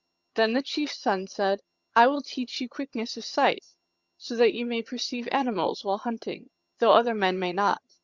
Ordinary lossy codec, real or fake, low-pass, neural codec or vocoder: Opus, 64 kbps; fake; 7.2 kHz; vocoder, 22.05 kHz, 80 mel bands, HiFi-GAN